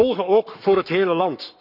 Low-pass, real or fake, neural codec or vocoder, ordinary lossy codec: 5.4 kHz; fake; codec, 44.1 kHz, 7.8 kbps, Pupu-Codec; none